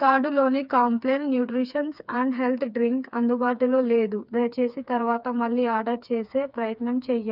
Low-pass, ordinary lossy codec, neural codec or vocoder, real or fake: 5.4 kHz; none; codec, 16 kHz, 4 kbps, FreqCodec, smaller model; fake